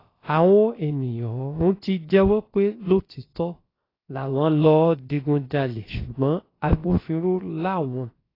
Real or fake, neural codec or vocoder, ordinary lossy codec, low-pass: fake; codec, 16 kHz, about 1 kbps, DyCAST, with the encoder's durations; AAC, 24 kbps; 5.4 kHz